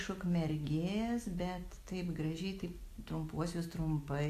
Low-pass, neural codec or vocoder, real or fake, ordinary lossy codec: 14.4 kHz; none; real; AAC, 64 kbps